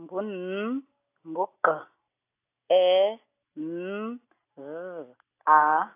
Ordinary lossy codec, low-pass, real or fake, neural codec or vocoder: AAC, 24 kbps; 3.6 kHz; real; none